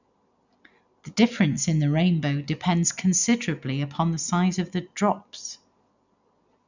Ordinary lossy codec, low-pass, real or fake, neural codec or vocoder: none; 7.2 kHz; real; none